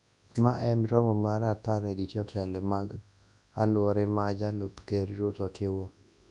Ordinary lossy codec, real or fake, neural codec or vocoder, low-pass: none; fake; codec, 24 kHz, 0.9 kbps, WavTokenizer, large speech release; 10.8 kHz